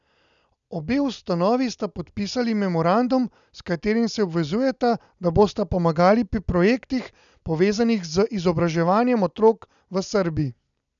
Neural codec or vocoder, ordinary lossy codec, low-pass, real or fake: none; none; 7.2 kHz; real